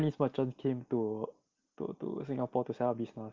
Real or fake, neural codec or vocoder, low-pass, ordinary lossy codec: real; none; 7.2 kHz; Opus, 32 kbps